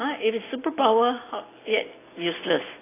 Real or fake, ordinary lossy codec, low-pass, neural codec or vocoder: real; AAC, 16 kbps; 3.6 kHz; none